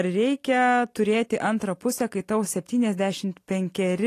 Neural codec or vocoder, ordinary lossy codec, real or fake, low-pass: none; AAC, 48 kbps; real; 14.4 kHz